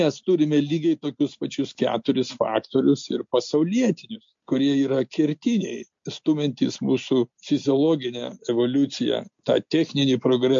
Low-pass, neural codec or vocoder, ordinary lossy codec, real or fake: 7.2 kHz; none; MP3, 48 kbps; real